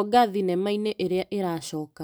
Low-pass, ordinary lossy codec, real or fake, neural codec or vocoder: none; none; real; none